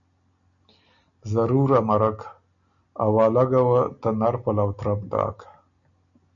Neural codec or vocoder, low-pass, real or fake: none; 7.2 kHz; real